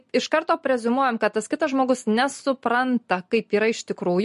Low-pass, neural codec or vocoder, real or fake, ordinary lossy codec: 14.4 kHz; none; real; MP3, 48 kbps